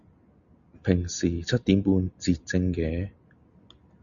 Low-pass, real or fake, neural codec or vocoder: 7.2 kHz; real; none